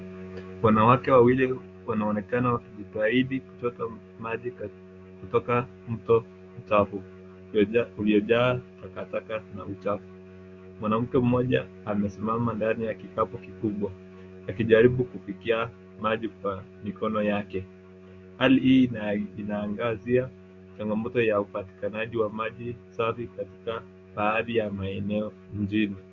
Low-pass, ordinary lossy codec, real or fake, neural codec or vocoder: 7.2 kHz; Opus, 64 kbps; fake; codec, 16 kHz, 6 kbps, DAC